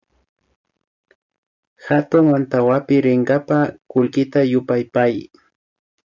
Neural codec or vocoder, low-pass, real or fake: none; 7.2 kHz; real